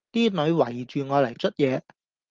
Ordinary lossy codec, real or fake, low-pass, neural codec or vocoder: Opus, 32 kbps; real; 7.2 kHz; none